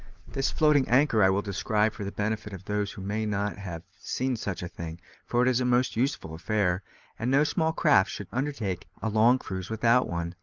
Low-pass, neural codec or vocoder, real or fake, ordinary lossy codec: 7.2 kHz; none; real; Opus, 24 kbps